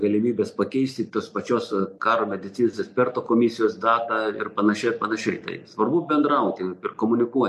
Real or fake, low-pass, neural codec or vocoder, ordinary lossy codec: real; 14.4 kHz; none; MP3, 64 kbps